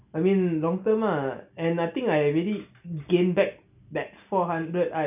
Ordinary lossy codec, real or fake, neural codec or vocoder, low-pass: none; real; none; 3.6 kHz